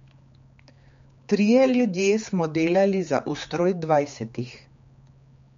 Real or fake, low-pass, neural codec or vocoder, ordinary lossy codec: fake; 7.2 kHz; codec, 16 kHz, 4 kbps, X-Codec, HuBERT features, trained on general audio; MP3, 48 kbps